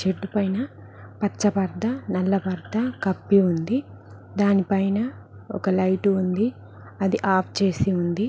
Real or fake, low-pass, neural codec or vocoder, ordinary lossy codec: real; none; none; none